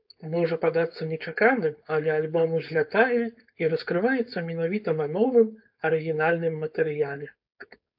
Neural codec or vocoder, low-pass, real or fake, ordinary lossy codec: codec, 16 kHz, 4.8 kbps, FACodec; 5.4 kHz; fake; AAC, 48 kbps